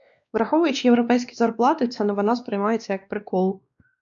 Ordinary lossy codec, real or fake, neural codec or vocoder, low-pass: MP3, 96 kbps; fake; codec, 16 kHz, 2 kbps, X-Codec, WavLM features, trained on Multilingual LibriSpeech; 7.2 kHz